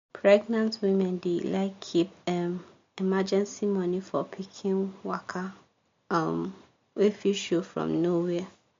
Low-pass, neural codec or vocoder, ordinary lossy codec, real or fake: 7.2 kHz; none; MP3, 48 kbps; real